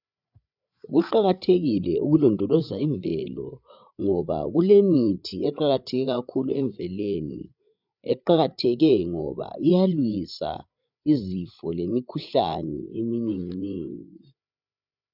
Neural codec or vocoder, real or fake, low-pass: codec, 16 kHz, 8 kbps, FreqCodec, larger model; fake; 5.4 kHz